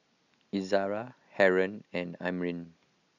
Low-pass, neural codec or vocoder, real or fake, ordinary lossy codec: 7.2 kHz; none; real; none